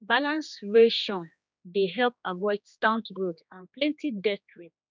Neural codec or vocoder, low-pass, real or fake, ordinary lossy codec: codec, 16 kHz, 2 kbps, X-Codec, HuBERT features, trained on general audio; none; fake; none